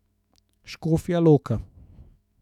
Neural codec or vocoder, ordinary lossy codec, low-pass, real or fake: autoencoder, 48 kHz, 128 numbers a frame, DAC-VAE, trained on Japanese speech; none; 19.8 kHz; fake